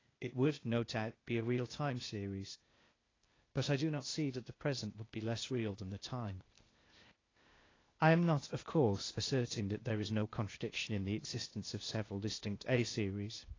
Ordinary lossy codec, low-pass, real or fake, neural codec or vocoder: AAC, 32 kbps; 7.2 kHz; fake; codec, 16 kHz, 0.8 kbps, ZipCodec